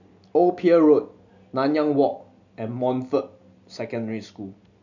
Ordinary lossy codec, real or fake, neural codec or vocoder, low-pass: none; real; none; 7.2 kHz